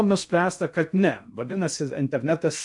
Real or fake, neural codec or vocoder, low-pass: fake; codec, 16 kHz in and 24 kHz out, 0.6 kbps, FocalCodec, streaming, 2048 codes; 10.8 kHz